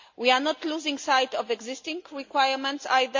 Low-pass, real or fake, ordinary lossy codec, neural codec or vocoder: 7.2 kHz; real; MP3, 48 kbps; none